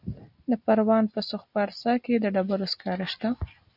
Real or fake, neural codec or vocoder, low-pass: real; none; 5.4 kHz